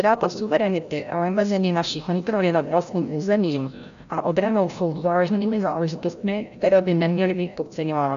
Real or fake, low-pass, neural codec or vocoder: fake; 7.2 kHz; codec, 16 kHz, 0.5 kbps, FreqCodec, larger model